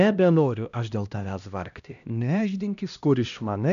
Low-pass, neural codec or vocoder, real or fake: 7.2 kHz; codec, 16 kHz, 1 kbps, X-Codec, HuBERT features, trained on LibriSpeech; fake